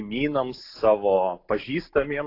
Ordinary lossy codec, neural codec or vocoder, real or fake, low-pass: AAC, 32 kbps; none; real; 5.4 kHz